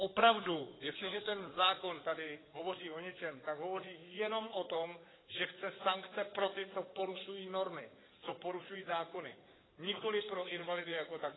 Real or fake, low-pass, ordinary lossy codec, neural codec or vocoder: fake; 7.2 kHz; AAC, 16 kbps; codec, 16 kHz in and 24 kHz out, 2.2 kbps, FireRedTTS-2 codec